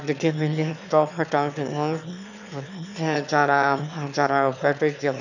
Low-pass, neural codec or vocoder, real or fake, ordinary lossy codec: 7.2 kHz; autoencoder, 22.05 kHz, a latent of 192 numbers a frame, VITS, trained on one speaker; fake; none